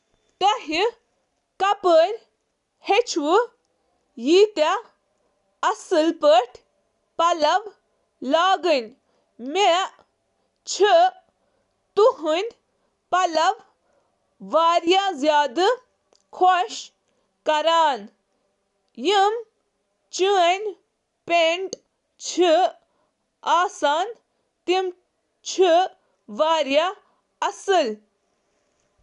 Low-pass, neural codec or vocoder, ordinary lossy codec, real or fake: 10.8 kHz; none; none; real